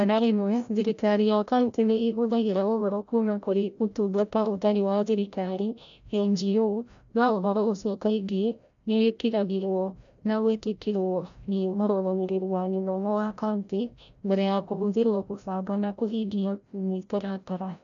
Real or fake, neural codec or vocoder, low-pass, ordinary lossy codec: fake; codec, 16 kHz, 0.5 kbps, FreqCodec, larger model; 7.2 kHz; none